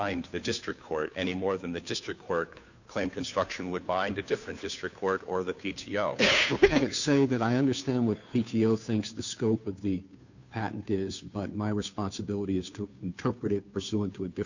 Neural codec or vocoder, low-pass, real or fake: codec, 16 kHz, 2 kbps, FunCodec, trained on Chinese and English, 25 frames a second; 7.2 kHz; fake